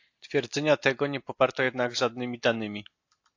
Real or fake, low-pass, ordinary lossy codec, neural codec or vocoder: real; 7.2 kHz; AAC, 48 kbps; none